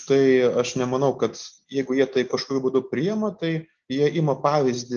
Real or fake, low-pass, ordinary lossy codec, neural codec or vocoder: real; 7.2 kHz; Opus, 24 kbps; none